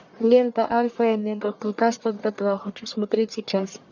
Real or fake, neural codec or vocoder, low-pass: fake; codec, 44.1 kHz, 1.7 kbps, Pupu-Codec; 7.2 kHz